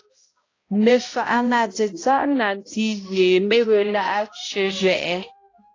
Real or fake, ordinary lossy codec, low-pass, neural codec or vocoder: fake; AAC, 32 kbps; 7.2 kHz; codec, 16 kHz, 0.5 kbps, X-Codec, HuBERT features, trained on balanced general audio